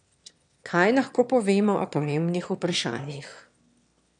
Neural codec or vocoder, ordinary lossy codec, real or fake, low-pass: autoencoder, 22.05 kHz, a latent of 192 numbers a frame, VITS, trained on one speaker; none; fake; 9.9 kHz